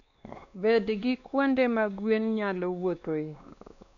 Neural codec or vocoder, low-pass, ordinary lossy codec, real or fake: codec, 16 kHz, 2 kbps, X-Codec, WavLM features, trained on Multilingual LibriSpeech; 7.2 kHz; none; fake